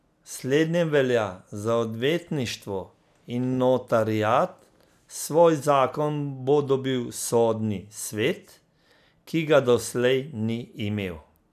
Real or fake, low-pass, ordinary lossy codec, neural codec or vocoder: real; 14.4 kHz; none; none